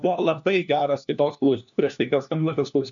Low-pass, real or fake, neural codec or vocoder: 7.2 kHz; fake; codec, 16 kHz, 1 kbps, FunCodec, trained on LibriTTS, 50 frames a second